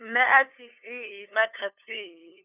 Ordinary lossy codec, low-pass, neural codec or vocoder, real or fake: none; 3.6 kHz; codec, 16 kHz, 2 kbps, FunCodec, trained on LibriTTS, 25 frames a second; fake